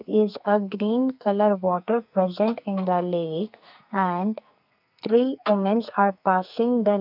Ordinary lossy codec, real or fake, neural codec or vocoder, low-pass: none; fake; codec, 44.1 kHz, 2.6 kbps, SNAC; 5.4 kHz